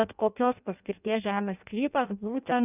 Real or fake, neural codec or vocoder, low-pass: fake; codec, 16 kHz in and 24 kHz out, 0.6 kbps, FireRedTTS-2 codec; 3.6 kHz